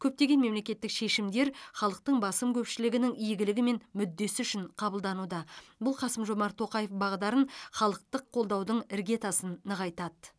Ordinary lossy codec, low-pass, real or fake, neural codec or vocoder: none; none; real; none